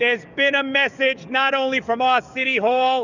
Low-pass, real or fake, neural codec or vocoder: 7.2 kHz; fake; codec, 44.1 kHz, 7.8 kbps, DAC